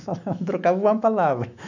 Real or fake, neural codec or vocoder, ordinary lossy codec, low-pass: fake; autoencoder, 48 kHz, 128 numbers a frame, DAC-VAE, trained on Japanese speech; none; 7.2 kHz